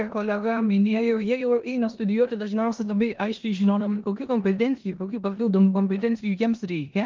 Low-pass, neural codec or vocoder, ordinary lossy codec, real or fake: 7.2 kHz; codec, 16 kHz in and 24 kHz out, 0.9 kbps, LongCat-Audio-Codec, four codebook decoder; Opus, 32 kbps; fake